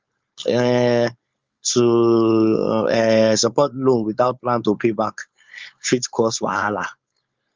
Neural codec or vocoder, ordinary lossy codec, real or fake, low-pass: codec, 16 kHz, 4.8 kbps, FACodec; Opus, 32 kbps; fake; 7.2 kHz